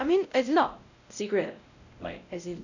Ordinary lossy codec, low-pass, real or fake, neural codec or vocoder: none; 7.2 kHz; fake; codec, 16 kHz, 0.5 kbps, X-Codec, WavLM features, trained on Multilingual LibriSpeech